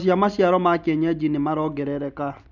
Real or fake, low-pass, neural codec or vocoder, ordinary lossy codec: real; 7.2 kHz; none; none